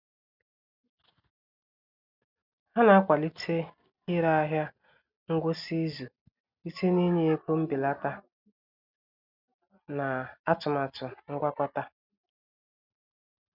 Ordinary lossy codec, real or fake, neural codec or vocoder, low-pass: none; real; none; 5.4 kHz